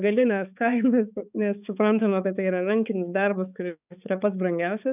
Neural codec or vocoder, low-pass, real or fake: codec, 16 kHz, 4 kbps, X-Codec, HuBERT features, trained on balanced general audio; 3.6 kHz; fake